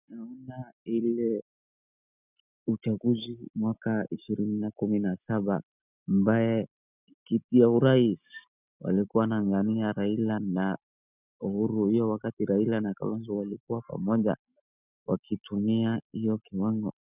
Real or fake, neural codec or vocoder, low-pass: real; none; 3.6 kHz